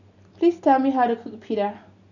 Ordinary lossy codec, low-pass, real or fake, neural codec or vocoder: none; 7.2 kHz; real; none